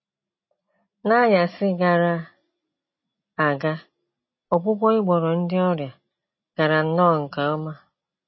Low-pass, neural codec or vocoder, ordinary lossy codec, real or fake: 7.2 kHz; none; MP3, 24 kbps; real